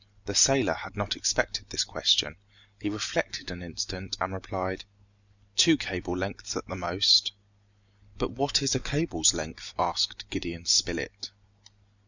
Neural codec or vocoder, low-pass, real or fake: none; 7.2 kHz; real